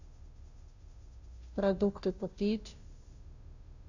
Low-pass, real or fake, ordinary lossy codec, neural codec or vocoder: 7.2 kHz; fake; none; codec, 16 kHz, 0.5 kbps, FunCodec, trained on Chinese and English, 25 frames a second